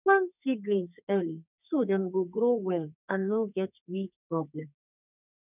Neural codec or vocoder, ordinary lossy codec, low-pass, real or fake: codec, 32 kHz, 1.9 kbps, SNAC; none; 3.6 kHz; fake